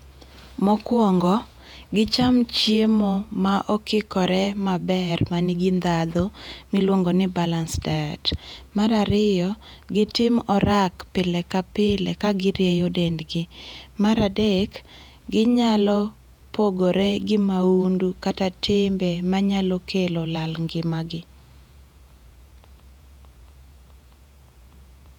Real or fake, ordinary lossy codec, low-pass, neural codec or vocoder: fake; none; 19.8 kHz; vocoder, 48 kHz, 128 mel bands, Vocos